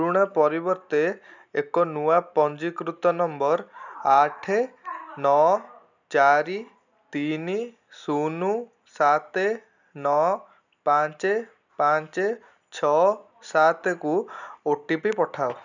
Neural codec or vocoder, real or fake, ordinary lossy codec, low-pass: none; real; none; 7.2 kHz